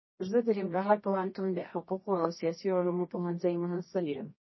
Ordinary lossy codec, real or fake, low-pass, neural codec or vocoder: MP3, 24 kbps; fake; 7.2 kHz; codec, 24 kHz, 0.9 kbps, WavTokenizer, medium music audio release